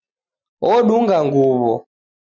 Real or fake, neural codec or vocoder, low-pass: real; none; 7.2 kHz